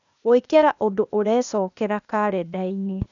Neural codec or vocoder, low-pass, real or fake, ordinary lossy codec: codec, 16 kHz, 0.8 kbps, ZipCodec; 7.2 kHz; fake; none